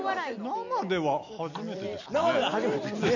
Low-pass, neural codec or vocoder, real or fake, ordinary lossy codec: 7.2 kHz; none; real; none